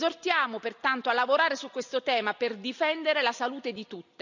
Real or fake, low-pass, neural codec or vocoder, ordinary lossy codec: real; 7.2 kHz; none; none